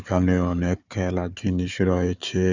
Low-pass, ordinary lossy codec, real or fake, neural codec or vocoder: 7.2 kHz; Opus, 64 kbps; fake; codec, 16 kHz, 4 kbps, FunCodec, trained on Chinese and English, 50 frames a second